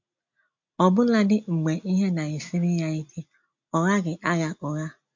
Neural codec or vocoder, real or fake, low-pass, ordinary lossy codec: none; real; 7.2 kHz; MP3, 48 kbps